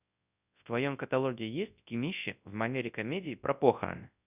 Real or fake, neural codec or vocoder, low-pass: fake; codec, 24 kHz, 0.9 kbps, WavTokenizer, large speech release; 3.6 kHz